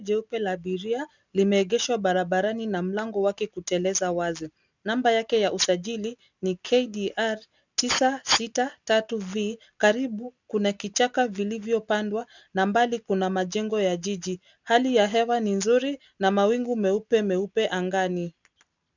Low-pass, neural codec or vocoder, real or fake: 7.2 kHz; none; real